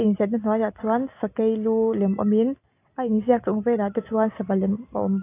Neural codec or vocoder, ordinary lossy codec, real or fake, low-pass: none; AAC, 24 kbps; real; 3.6 kHz